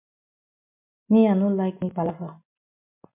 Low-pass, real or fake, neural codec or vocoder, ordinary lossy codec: 3.6 kHz; real; none; MP3, 32 kbps